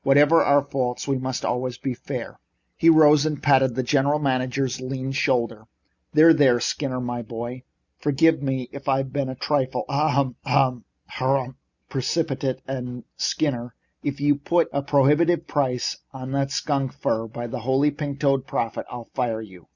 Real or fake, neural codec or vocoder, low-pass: real; none; 7.2 kHz